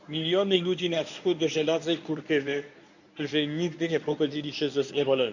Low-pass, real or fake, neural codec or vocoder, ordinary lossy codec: 7.2 kHz; fake; codec, 24 kHz, 0.9 kbps, WavTokenizer, medium speech release version 1; none